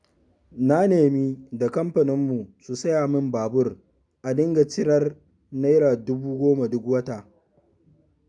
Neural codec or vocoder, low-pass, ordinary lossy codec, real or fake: none; 9.9 kHz; none; real